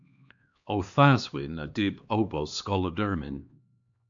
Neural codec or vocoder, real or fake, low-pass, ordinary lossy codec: codec, 16 kHz, 2 kbps, X-Codec, HuBERT features, trained on LibriSpeech; fake; 7.2 kHz; AAC, 64 kbps